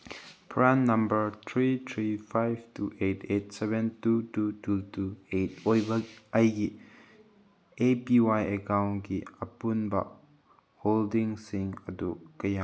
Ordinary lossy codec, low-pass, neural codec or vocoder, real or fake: none; none; none; real